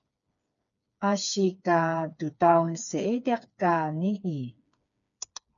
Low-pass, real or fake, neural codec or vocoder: 7.2 kHz; fake; codec, 16 kHz, 4 kbps, FreqCodec, smaller model